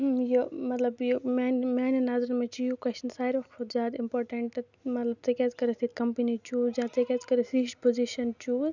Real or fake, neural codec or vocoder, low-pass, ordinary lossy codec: real; none; 7.2 kHz; none